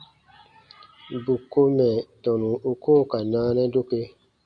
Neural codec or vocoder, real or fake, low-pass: none; real; 9.9 kHz